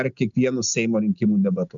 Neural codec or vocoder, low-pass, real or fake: none; 7.2 kHz; real